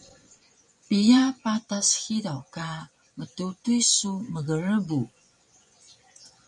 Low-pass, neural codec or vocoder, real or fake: 10.8 kHz; vocoder, 24 kHz, 100 mel bands, Vocos; fake